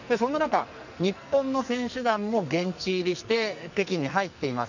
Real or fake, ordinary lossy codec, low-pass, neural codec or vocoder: fake; none; 7.2 kHz; codec, 44.1 kHz, 2.6 kbps, SNAC